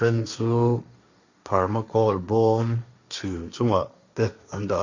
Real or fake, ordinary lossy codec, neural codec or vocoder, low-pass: fake; Opus, 64 kbps; codec, 16 kHz, 1.1 kbps, Voila-Tokenizer; 7.2 kHz